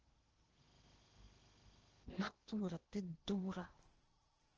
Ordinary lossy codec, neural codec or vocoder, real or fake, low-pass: Opus, 16 kbps; codec, 16 kHz in and 24 kHz out, 0.8 kbps, FocalCodec, streaming, 65536 codes; fake; 7.2 kHz